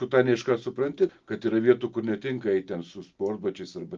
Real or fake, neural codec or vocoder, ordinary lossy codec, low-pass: real; none; Opus, 24 kbps; 7.2 kHz